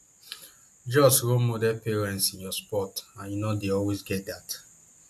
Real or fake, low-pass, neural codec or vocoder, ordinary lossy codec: real; 14.4 kHz; none; AAC, 96 kbps